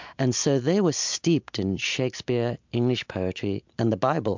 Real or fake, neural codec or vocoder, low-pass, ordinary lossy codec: real; none; 7.2 kHz; MP3, 64 kbps